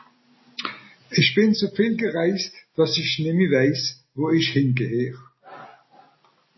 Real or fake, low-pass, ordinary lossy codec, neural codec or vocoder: real; 7.2 kHz; MP3, 24 kbps; none